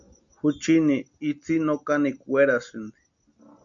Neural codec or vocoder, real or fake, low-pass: none; real; 7.2 kHz